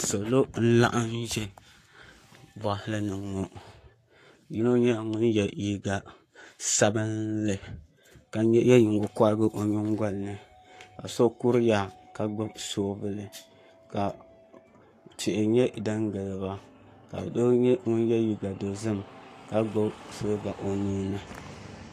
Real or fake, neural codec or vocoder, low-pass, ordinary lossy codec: fake; codec, 44.1 kHz, 7.8 kbps, Pupu-Codec; 14.4 kHz; AAC, 64 kbps